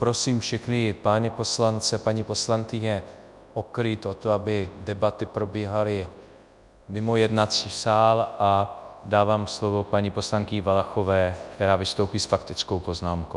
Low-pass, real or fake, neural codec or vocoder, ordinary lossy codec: 10.8 kHz; fake; codec, 24 kHz, 0.9 kbps, WavTokenizer, large speech release; Opus, 64 kbps